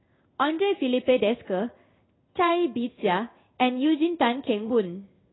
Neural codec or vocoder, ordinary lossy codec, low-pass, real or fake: codec, 16 kHz in and 24 kHz out, 1 kbps, XY-Tokenizer; AAC, 16 kbps; 7.2 kHz; fake